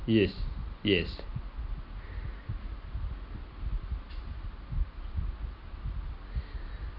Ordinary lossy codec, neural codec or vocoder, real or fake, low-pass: none; none; real; 5.4 kHz